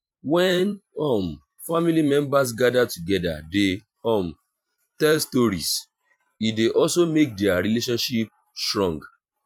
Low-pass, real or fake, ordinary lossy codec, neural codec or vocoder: 19.8 kHz; fake; none; vocoder, 44.1 kHz, 128 mel bands every 512 samples, BigVGAN v2